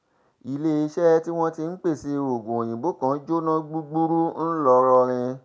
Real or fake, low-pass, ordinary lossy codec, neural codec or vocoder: real; none; none; none